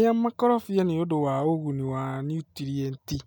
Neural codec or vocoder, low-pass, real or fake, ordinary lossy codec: none; none; real; none